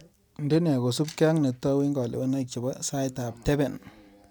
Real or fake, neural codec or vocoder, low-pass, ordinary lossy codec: real; none; none; none